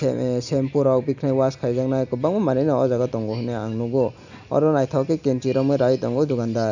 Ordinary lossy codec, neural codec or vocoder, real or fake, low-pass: none; none; real; 7.2 kHz